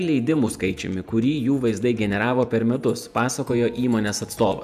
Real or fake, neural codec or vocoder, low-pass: fake; vocoder, 48 kHz, 128 mel bands, Vocos; 14.4 kHz